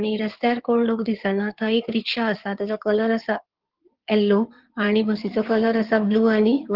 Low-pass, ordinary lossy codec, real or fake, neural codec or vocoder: 5.4 kHz; Opus, 16 kbps; fake; codec, 16 kHz in and 24 kHz out, 2.2 kbps, FireRedTTS-2 codec